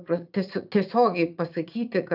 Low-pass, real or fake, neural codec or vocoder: 5.4 kHz; fake; vocoder, 44.1 kHz, 80 mel bands, Vocos